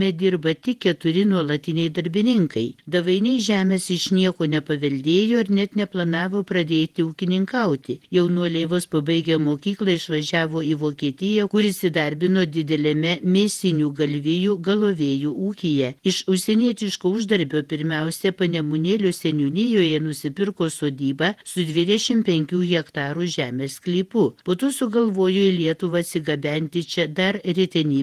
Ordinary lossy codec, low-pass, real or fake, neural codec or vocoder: Opus, 24 kbps; 14.4 kHz; fake; vocoder, 48 kHz, 128 mel bands, Vocos